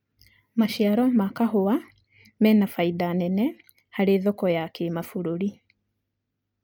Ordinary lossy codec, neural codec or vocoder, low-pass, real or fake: none; none; 19.8 kHz; real